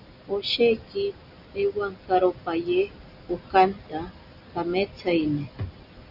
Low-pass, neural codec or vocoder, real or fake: 5.4 kHz; none; real